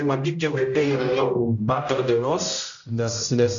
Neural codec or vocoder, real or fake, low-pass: codec, 16 kHz, 0.5 kbps, X-Codec, HuBERT features, trained on general audio; fake; 7.2 kHz